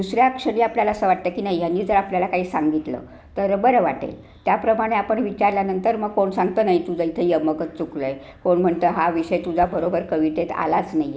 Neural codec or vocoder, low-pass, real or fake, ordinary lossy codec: none; none; real; none